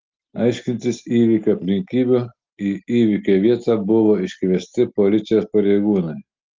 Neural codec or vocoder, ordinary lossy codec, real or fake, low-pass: none; Opus, 24 kbps; real; 7.2 kHz